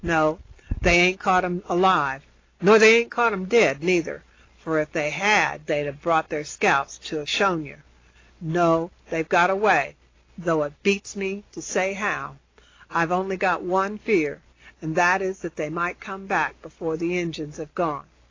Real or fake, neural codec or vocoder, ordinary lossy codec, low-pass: real; none; AAC, 32 kbps; 7.2 kHz